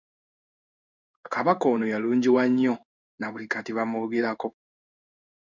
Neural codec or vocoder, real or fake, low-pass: codec, 16 kHz in and 24 kHz out, 1 kbps, XY-Tokenizer; fake; 7.2 kHz